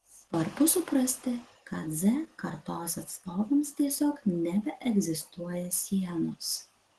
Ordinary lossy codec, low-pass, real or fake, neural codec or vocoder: Opus, 16 kbps; 10.8 kHz; real; none